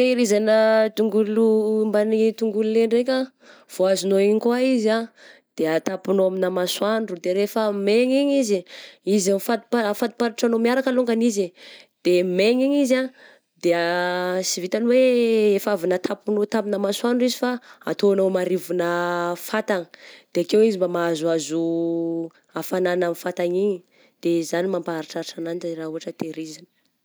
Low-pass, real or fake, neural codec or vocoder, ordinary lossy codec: none; real; none; none